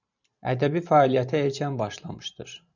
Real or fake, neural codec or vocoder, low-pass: fake; vocoder, 44.1 kHz, 128 mel bands every 512 samples, BigVGAN v2; 7.2 kHz